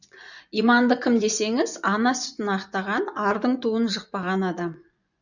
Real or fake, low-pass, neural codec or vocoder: fake; 7.2 kHz; vocoder, 24 kHz, 100 mel bands, Vocos